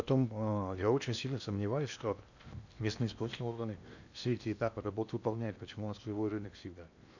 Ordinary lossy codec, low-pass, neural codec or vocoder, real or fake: none; 7.2 kHz; codec, 16 kHz in and 24 kHz out, 0.8 kbps, FocalCodec, streaming, 65536 codes; fake